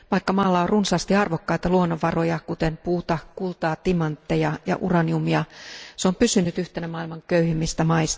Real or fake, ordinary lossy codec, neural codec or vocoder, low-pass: real; none; none; none